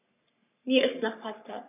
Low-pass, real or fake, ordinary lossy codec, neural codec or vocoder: 3.6 kHz; fake; none; codec, 44.1 kHz, 3.4 kbps, Pupu-Codec